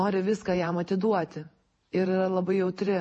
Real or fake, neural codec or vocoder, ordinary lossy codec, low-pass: fake; vocoder, 48 kHz, 128 mel bands, Vocos; MP3, 32 kbps; 10.8 kHz